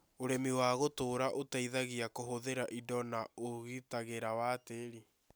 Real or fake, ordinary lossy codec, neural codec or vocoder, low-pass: real; none; none; none